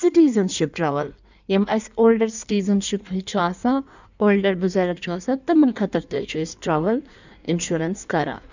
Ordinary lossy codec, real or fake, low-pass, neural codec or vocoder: none; fake; 7.2 kHz; codec, 16 kHz in and 24 kHz out, 1.1 kbps, FireRedTTS-2 codec